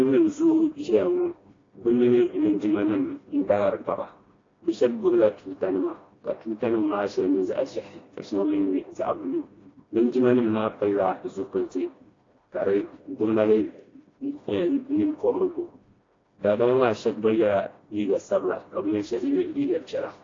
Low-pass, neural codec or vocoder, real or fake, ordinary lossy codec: 7.2 kHz; codec, 16 kHz, 1 kbps, FreqCodec, smaller model; fake; AAC, 32 kbps